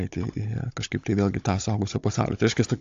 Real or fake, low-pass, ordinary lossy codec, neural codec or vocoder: fake; 7.2 kHz; AAC, 48 kbps; codec, 16 kHz, 16 kbps, FunCodec, trained on Chinese and English, 50 frames a second